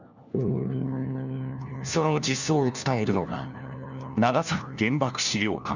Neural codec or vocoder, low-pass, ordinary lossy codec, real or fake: codec, 16 kHz, 1 kbps, FunCodec, trained on LibriTTS, 50 frames a second; 7.2 kHz; none; fake